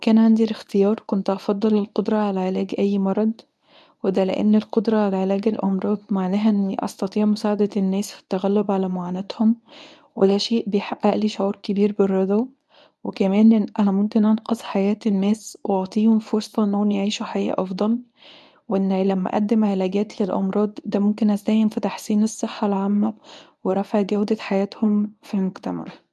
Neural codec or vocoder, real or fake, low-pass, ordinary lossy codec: codec, 24 kHz, 0.9 kbps, WavTokenizer, medium speech release version 1; fake; none; none